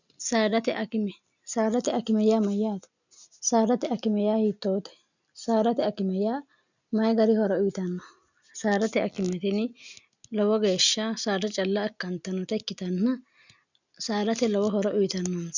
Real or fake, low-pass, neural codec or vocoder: real; 7.2 kHz; none